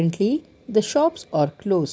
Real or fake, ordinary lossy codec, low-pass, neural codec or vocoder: real; none; none; none